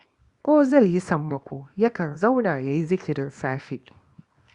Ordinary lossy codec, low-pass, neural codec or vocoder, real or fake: Opus, 64 kbps; 10.8 kHz; codec, 24 kHz, 0.9 kbps, WavTokenizer, small release; fake